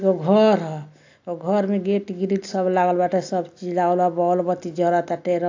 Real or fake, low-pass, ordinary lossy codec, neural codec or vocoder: real; 7.2 kHz; AAC, 48 kbps; none